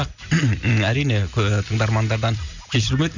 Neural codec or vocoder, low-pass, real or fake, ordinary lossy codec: none; 7.2 kHz; real; none